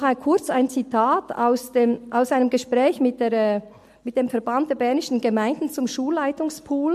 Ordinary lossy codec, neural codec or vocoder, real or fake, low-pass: MP3, 64 kbps; none; real; 14.4 kHz